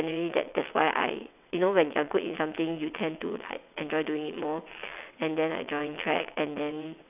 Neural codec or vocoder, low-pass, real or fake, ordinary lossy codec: vocoder, 22.05 kHz, 80 mel bands, WaveNeXt; 3.6 kHz; fake; none